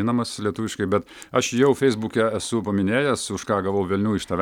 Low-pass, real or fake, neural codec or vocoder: 19.8 kHz; real; none